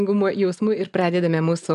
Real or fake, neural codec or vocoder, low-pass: real; none; 10.8 kHz